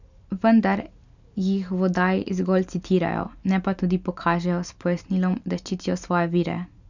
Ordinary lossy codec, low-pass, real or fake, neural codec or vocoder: none; 7.2 kHz; real; none